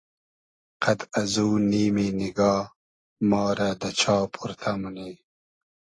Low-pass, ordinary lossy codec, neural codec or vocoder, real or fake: 10.8 kHz; AAC, 32 kbps; none; real